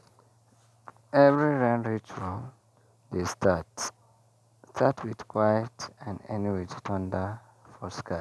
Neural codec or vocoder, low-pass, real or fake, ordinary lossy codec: none; none; real; none